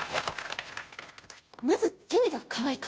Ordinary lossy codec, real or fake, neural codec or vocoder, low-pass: none; fake; codec, 16 kHz, 0.5 kbps, FunCodec, trained on Chinese and English, 25 frames a second; none